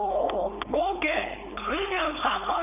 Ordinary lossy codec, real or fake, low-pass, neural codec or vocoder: none; fake; 3.6 kHz; codec, 16 kHz, 4.8 kbps, FACodec